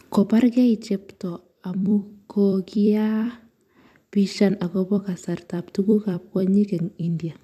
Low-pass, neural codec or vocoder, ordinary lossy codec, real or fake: 14.4 kHz; vocoder, 44.1 kHz, 128 mel bands every 256 samples, BigVGAN v2; AAC, 96 kbps; fake